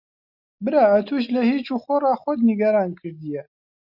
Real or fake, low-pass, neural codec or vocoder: real; 5.4 kHz; none